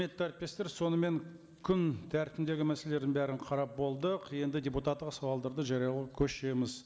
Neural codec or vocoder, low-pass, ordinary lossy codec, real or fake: none; none; none; real